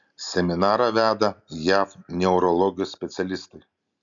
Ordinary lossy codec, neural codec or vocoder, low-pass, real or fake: MP3, 96 kbps; none; 7.2 kHz; real